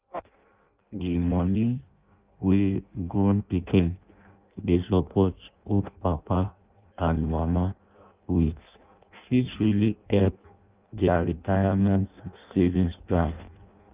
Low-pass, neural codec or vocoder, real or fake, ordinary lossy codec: 3.6 kHz; codec, 16 kHz in and 24 kHz out, 0.6 kbps, FireRedTTS-2 codec; fake; Opus, 24 kbps